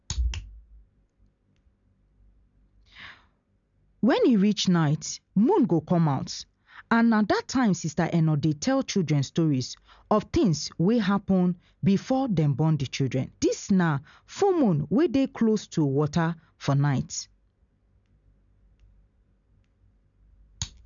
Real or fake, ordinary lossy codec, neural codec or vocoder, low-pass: real; none; none; 7.2 kHz